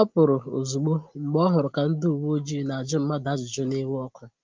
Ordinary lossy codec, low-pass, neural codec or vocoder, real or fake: Opus, 32 kbps; 7.2 kHz; none; real